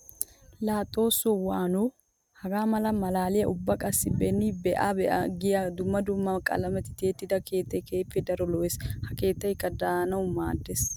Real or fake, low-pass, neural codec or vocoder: real; 19.8 kHz; none